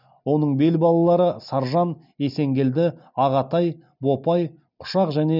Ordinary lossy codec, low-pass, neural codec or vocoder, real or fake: none; 5.4 kHz; none; real